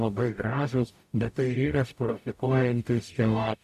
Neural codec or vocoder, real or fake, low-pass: codec, 44.1 kHz, 0.9 kbps, DAC; fake; 14.4 kHz